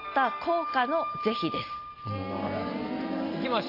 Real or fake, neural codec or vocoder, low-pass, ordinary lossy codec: real; none; 5.4 kHz; AAC, 32 kbps